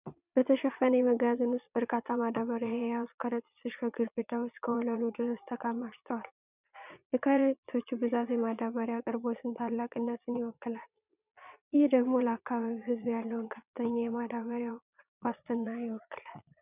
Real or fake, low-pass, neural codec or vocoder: fake; 3.6 kHz; vocoder, 22.05 kHz, 80 mel bands, Vocos